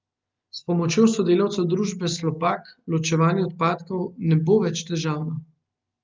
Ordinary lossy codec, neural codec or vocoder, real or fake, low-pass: Opus, 24 kbps; none; real; 7.2 kHz